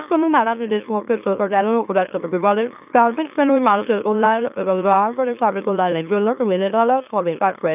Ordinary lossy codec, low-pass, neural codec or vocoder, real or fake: none; 3.6 kHz; autoencoder, 44.1 kHz, a latent of 192 numbers a frame, MeloTTS; fake